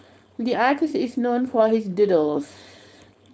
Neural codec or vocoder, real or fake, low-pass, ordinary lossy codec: codec, 16 kHz, 4.8 kbps, FACodec; fake; none; none